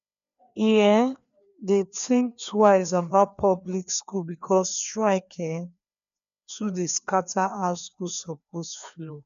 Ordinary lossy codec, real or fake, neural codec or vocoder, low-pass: none; fake; codec, 16 kHz, 2 kbps, FreqCodec, larger model; 7.2 kHz